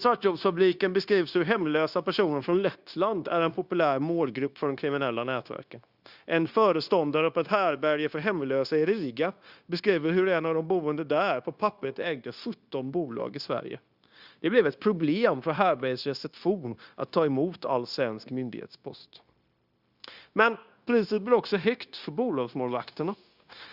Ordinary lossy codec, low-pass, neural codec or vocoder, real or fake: Opus, 64 kbps; 5.4 kHz; codec, 16 kHz, 0.9 kbps, LongCat-Audio-Codec; fake